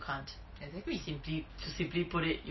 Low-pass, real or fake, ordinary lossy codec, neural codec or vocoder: 7.2 kHz; real; MP3, 24 kbps; none